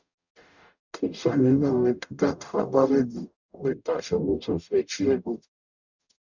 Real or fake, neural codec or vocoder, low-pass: fake; codec, 44.1 kHz, 0.9 kbps, DAC; 7.2 kHz